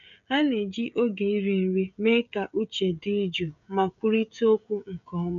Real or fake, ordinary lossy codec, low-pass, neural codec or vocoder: fake; none; 7.2 kHz; codec, 16 kHz, 16 kbps, FreqCodec, smaller model